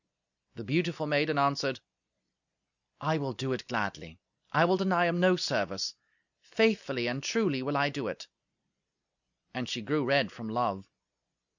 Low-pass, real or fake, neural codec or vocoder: 7.2 kHz; real; none